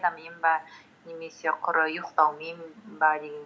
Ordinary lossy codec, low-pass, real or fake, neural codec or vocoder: none; none; real; none